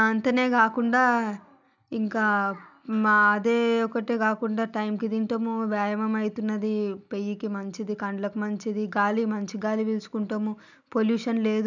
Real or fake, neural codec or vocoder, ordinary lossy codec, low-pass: real; none; none; 7.2 kHz